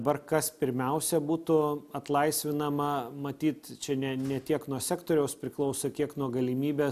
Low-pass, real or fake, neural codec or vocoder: 14.4 kHz; real; none